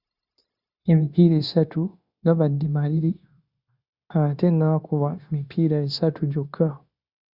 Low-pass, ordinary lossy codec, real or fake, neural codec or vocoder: 5.4 kHz; Opus, 64 kbps; fake; codec, 16 kHz, 0.9 kbps, LongCat-Audio-Codec